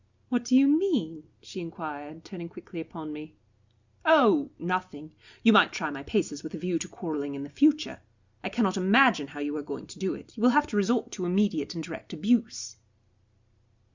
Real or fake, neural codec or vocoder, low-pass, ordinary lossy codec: real; none; 7.2 kHz; Opus, 64 kbps